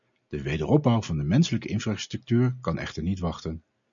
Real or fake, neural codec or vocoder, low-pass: real; none; 7.2 kHz